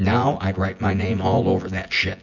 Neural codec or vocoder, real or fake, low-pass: vocoder, 24 kHz, 100 mel bands, Vocos; fake; 7.2 kHz